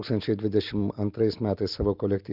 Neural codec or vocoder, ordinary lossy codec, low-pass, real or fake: none; Opus, 24 kbps; 5.4 kHz; real